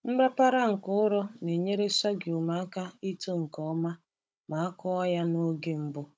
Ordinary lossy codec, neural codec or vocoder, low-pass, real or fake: none; codec, 16 kHz, 16 kbps, FunCodec, trained on Chinese and English, 50 frames a second; none; fake